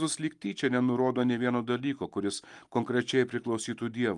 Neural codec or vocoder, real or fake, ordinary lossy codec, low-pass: none; real; Opus, 32 kbps; 10.8 kHz